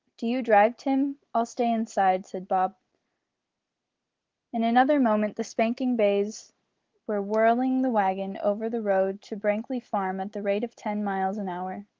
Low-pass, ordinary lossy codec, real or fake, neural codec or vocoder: 7.2 kHz; Opus, 16 kbps; real; none